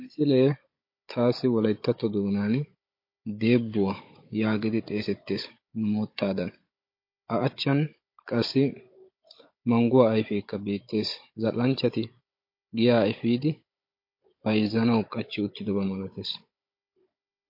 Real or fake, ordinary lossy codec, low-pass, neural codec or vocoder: fake; MP3, 32 kbps; 5.4 kHz; codec, 16 kHz, 16 kbps, FunCodec, trained on Chinese and English, 50 frames a second